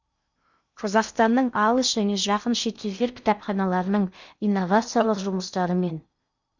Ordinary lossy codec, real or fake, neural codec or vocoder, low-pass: none; fake; codec, 16 kHz in and 24 kHz out, 0.6 kbps, FocalCodec, streaming, 2048 codes; 7.2 kHz